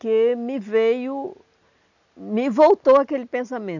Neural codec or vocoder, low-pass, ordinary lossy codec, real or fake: none; 7.2 kHz; none; real